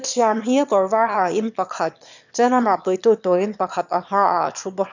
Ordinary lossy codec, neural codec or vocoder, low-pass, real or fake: none; autoencoder, 22.05 kHz, a latent of 192 numbers a frame, VITS, trained on one speaker; 7.2 kHz; fake